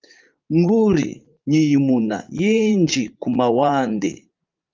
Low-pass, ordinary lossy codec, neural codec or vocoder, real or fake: 7.2 kHz; Opus, 32 kbps; vocoder, 44.1 kHz, 80 mel bands, Vocos; fake